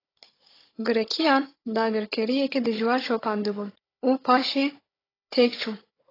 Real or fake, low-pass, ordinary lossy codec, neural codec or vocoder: fake; 5.4 kHz; AAC, 24 kbps; codec, 16 kHz, 16 kbps, FunCodec, trained on Chinese and English, 50 frames a second